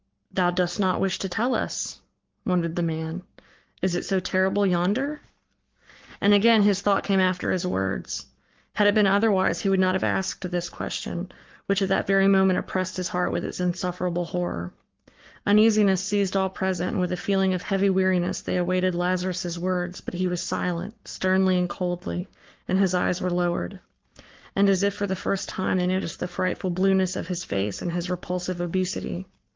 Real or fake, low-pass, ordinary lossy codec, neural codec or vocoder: fake; 7.2 kHz; Opus, 32 kbps; codec, 44.1 kHz, 7.8 kbps, Pupu-Codec